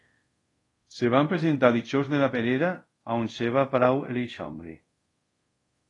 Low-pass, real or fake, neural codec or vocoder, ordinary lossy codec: 10.8 kHz; fake; codec, 24 kHz, 0.5 kbps, DualCodec; AAC, 32 kbps